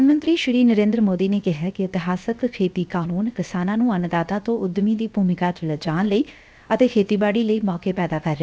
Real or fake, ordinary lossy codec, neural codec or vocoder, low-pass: fake; none; codec, 16 kHz, 0.7 kbps, FocalCodec; none